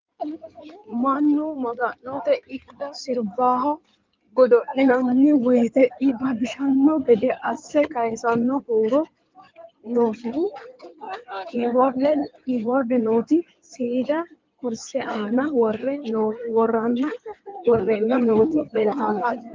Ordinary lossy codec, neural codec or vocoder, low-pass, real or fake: Opus, 24 kbps; codec, 16 kHz in and 24 kHz out, 2.2 kbps, FireRedTTS-2 codec; 7.2 kHz; fake